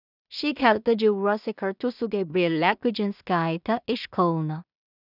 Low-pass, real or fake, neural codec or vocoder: 5.4 kHz; fake; codec, 16 kHz in and 24 kHz out, 0.4 kbps, LongCat-Audio-Codec, two codebook decoder